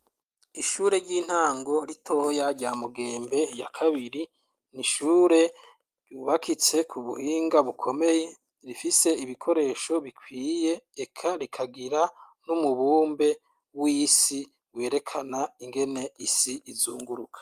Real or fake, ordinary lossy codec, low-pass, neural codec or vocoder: real; Opus, 32 kbps; 14.4 kHz; none